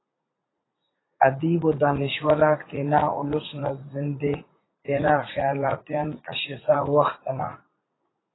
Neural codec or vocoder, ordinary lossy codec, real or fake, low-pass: vocoder, 44.1 kHz, 80 mel bands, Vocos; AAC, 16 kbps; fake; 7.2 kHz